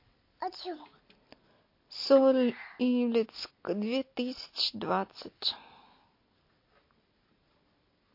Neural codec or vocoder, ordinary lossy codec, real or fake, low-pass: none; MP3, 32 kbps; real; 5.4 kHz